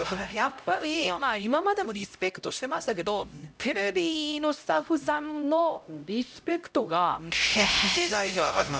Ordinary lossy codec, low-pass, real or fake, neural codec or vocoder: none; none; fake; codec, 16 kHz, 0.5 kbps, X-Codec, HuBERT features, trained on LibriSpeech